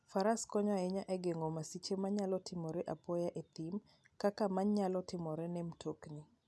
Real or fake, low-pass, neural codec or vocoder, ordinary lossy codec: real; none; none; none